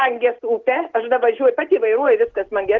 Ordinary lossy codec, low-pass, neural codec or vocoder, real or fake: Opus, 16 kbps; 7.2 kHz; none; real